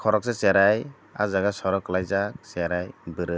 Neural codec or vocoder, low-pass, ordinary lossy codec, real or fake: none; none; none; real